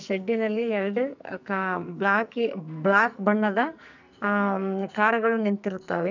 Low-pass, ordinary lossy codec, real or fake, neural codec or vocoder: 7.2 kHz; none; fake; codec, 44.1 kHz, 2.6 kbps, SNAC